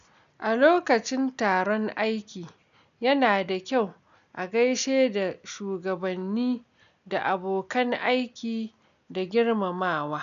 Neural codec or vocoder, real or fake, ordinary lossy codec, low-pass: none; real; none; 7.2 kHz